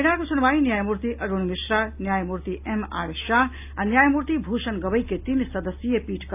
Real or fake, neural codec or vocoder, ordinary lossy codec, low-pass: real; none; AAC, 32 kbps; 3.6 kHz